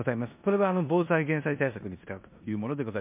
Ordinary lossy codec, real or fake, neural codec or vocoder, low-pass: MP3, 32 kbps; fake; codec, 16 kHz in and 24 kHz out, 0.9 kbps, LongCat-Audio-Codec, four codebook decoder; 3.6 kHz